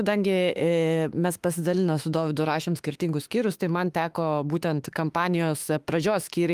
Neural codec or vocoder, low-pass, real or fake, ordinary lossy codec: autoencoder, 48 kHz, 32 numbers a frame, DAC-VAE, trained on Japanese speech; 14.4 kHz; fake; Opus, 32 kbps